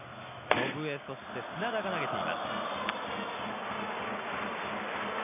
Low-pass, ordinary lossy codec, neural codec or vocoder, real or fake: 3.6 kHz; none; none; real